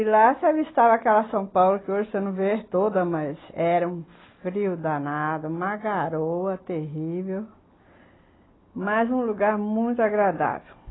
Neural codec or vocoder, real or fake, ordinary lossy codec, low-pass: none; real; AAC, 16 kbps; 7.2 kHz